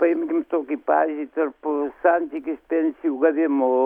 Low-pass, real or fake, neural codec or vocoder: 19.8 kHz; real; none